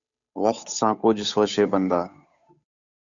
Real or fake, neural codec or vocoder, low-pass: fake; codec, 16 kHz, 8 kbps, FunCodec, trained on Chinese and English, 25 frames a second; 7.2 kHz